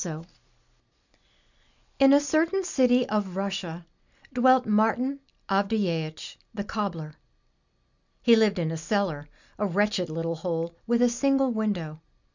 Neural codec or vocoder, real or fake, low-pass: none; real; 7.2 kHz